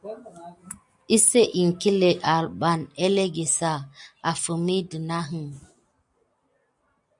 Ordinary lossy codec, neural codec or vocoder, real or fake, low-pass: MP3, 96 kbps; none; real; 10.8 kHz